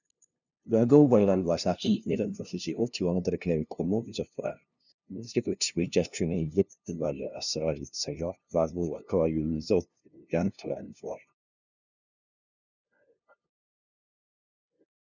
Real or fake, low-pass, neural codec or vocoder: fake; 7.2 kHz; codec, 16 kHz, 0.5 kbps, FunCodec, trained on LibriTTS, 25 frames a second